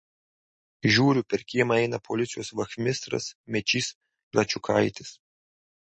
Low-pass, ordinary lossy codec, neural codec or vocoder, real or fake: 10.8 kHz; MP3, 32 kbps; none; real